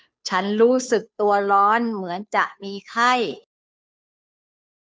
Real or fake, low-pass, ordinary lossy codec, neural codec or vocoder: fake; none; none; codec, 16 kHz, 2 kbps, FunCodec, trained on Chinese and English, 25 frames a second